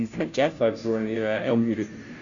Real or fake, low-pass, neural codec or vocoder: fake; 7.2 kHz; codec, 16 kHz, 0.5 kbps, FunCodec, trained on Chinese and English, 25 frames a second